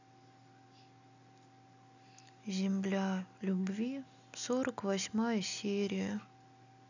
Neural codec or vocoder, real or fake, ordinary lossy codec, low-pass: none; real; none; 7.2 kHz